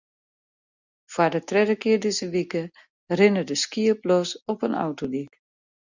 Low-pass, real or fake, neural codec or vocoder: 7.2 kHz; real; none